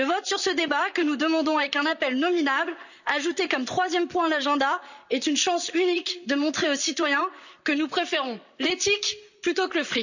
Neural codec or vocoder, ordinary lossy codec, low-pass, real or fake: vocoder, 44.1 kHz, 128 mel bands, Pupu-Vocoder; none; 7.2 kHz; fake